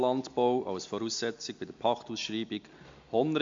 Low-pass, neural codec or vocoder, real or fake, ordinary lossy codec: 7.2 kHz; none; real; MP3, 48 kbps